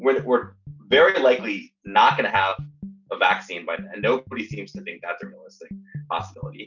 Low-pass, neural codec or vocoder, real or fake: 7.2 kHz; none; real